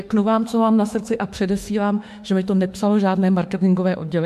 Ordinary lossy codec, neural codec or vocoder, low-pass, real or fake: MP3, 64 kbps; autoencoder, 48 kHz, 32 numbers a frame, DAC-VAE, trained on Japanese speech; 14.4 kHz; fake